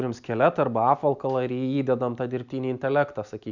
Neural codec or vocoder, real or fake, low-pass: none; real; 7.2 kHz